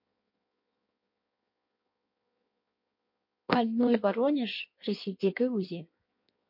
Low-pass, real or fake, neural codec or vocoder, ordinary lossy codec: 5.4 kHz; fake; codec, 16 kHz in and 24 kHz out, 1.1 kbps, FireRedTTS-2 codec; MP3, 32 kbps